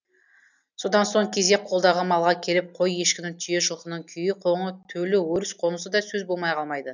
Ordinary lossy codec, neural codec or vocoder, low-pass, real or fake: none; none; 7.2 kHz; real